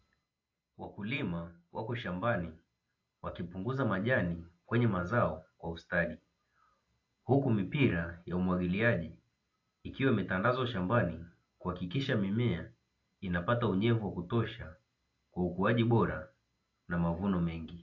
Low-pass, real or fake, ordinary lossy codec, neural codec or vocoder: 7.2 kHz; real; AAC, 48 kbps; none